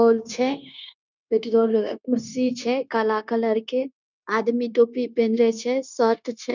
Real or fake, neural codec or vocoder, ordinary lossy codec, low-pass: fake; codec, 16 kHz, 0.9 kbps, LongCat-Audio-Codec; none; 7.2 kHz